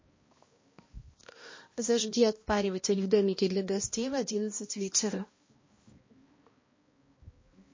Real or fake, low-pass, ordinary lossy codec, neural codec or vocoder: fake; 7.2 kHz; MP3, 32 kbps; codec, 16 kHz, 1 kbps, X-Codec, HuBERT features, trained on balanced general audio